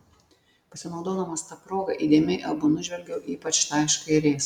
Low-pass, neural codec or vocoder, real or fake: 19.8 kHz; none; real